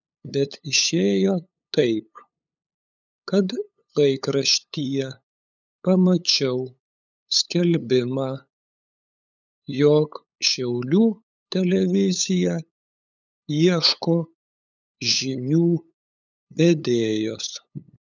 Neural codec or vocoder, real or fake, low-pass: codec, 16 kHz, 8 kbps, FunCodec, trained on LibriTTS, 25 frames a second; fake; 7.2 kHz